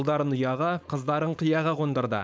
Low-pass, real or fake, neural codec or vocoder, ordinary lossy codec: none; fake; codec, 16 kHz, 4.8 kbps, FACodec; none